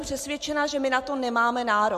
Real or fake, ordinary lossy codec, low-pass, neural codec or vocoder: real; MP3, 64 kbps; 14.4 kHz; none